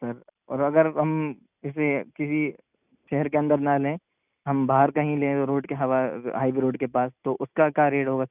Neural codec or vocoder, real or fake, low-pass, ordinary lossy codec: none; real; 3.6 kHz; none